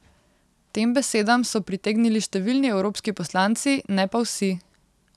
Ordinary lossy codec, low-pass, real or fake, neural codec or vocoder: none; none; real; none